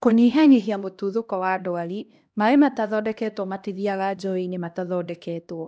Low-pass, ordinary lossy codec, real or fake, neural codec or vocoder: none; none; fake; codec, 16 kHz, 1 kbps, X-Codec, HuBERT features, trained on LibriSpeech